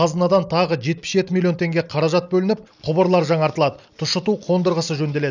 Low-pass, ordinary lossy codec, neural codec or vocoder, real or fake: 7.2 kHz; none; none; real